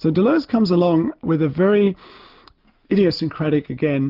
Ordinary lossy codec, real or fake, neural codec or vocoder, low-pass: Opus, 32 kbps; real; none; 5.4 kHz